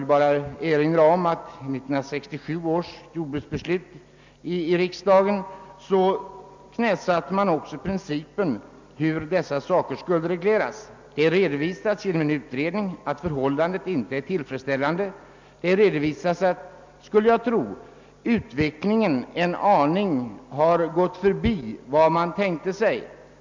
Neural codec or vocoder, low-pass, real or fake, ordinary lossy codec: none; 7.2 kHz; real; MP3, 64 kbps